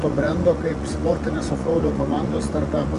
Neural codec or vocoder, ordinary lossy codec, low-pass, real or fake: vocoder, 24 kHz, 100 mel bands, Vocos; MP3, 96 kbps; 10.8 kHz; fake